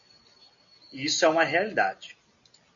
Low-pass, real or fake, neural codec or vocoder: 7.2 kHz; real; none